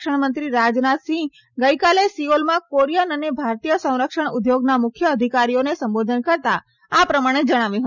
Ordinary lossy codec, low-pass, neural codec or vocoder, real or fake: none; 7.2 kHz; none; real